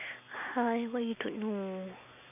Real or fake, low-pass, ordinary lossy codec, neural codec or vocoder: real; 3.6 kHz; none; none